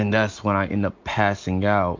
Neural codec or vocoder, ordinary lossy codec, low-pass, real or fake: none; MP3, 48 kbps; 7.2 kHz; real